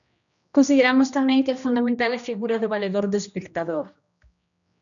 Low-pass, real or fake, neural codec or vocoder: 7.2 kHz; fake; codec, 16 kHz, 1 kbps, X-Codec, HuBERT features, trained on general audio